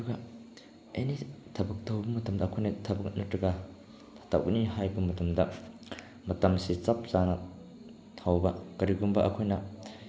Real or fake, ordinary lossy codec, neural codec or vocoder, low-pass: real; none; none; none